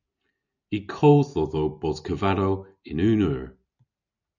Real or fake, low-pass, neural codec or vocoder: real; 7.2 kHz; none